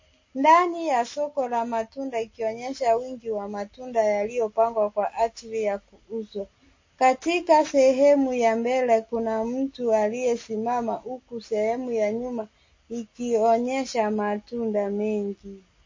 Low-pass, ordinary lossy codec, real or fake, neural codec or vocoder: 7.2 kHz; MP3, 32 kbps; real; none